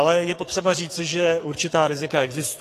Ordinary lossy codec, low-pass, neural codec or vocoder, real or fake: AAC, 48 kbps; 14.4 kHz; codec, 44.1 kHz, 2.6 kbps, SNAC; fake